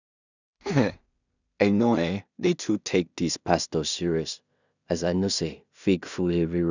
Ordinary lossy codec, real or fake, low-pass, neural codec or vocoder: none; fake; 7.2 kHz; codec, 16 kHz in and 24 kHz out, 0.4 kbps, LongCat-Audio-Codec, two codebook decoder